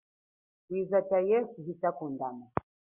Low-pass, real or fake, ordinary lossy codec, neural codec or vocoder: 3.6 kHz; real; Opus, 64 kbps; none